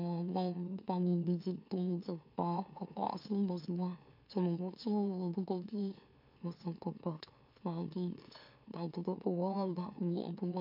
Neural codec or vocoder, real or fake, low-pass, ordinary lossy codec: autoencoder, 44.1 kHz, a latent of 192 numbers a frame, MeloTTS; fake; 5.4 kHz; MP3, 48 kbps